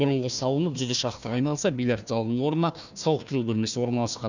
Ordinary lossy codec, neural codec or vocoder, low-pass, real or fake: none; codec, 16 kHz, 1 kbps, FunCodec, trained on Chinese and English, 50 frames a second; 7.2 kHz; fake